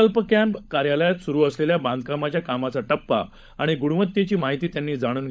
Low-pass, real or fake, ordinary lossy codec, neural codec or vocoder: none; fake; none; codec, 16 kHz, 16 kbps, FunCodec, trained on LibriTTS, 50 frames a second